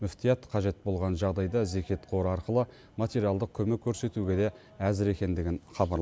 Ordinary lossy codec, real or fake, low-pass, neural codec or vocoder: none; real; none; none